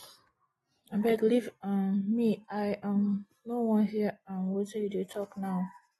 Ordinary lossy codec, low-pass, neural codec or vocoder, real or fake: AAC, 32 kbps; 19.8 kHz; none; real